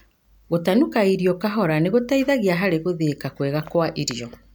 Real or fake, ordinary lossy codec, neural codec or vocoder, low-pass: real; none; none; none